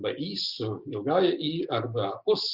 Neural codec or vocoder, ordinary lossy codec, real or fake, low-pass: none; Opus, 64 kbps; real; 5.4 kHz